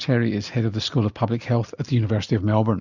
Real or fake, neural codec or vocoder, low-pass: real; none; 7.2 kHz